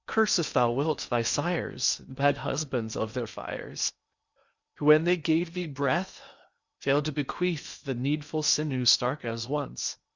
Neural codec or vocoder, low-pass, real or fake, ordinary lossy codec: codec, 16 kHz in and 24 kHz out, 0.8 kbps, FocalCodec, streaming, 65536 codes; 7.2 kHz; fake; Opus, 64 kbps